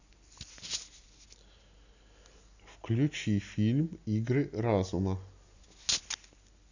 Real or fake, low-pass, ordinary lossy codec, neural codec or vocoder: real; 7.2 kHz; none; none